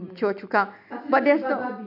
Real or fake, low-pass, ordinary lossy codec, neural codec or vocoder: real; 5.4 kHz; none; none